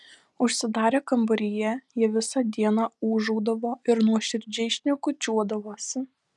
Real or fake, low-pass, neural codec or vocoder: real; 10.8 kHz; none